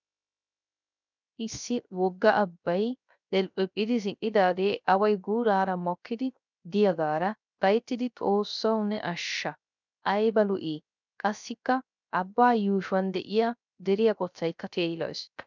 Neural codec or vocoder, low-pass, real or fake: codec, 16 kHz, 0.3 kbps, FocalCodec; 7.2 kHz; fake